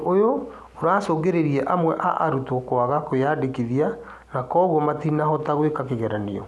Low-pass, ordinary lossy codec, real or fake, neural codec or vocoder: none; none; real; none